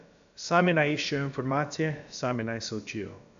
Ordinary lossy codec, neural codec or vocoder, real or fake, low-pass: AAC, 64 kbps; codec, 16 kHz, about 1 kbps, DyCAST, with the encoder's durations; fake; 7.2 kHz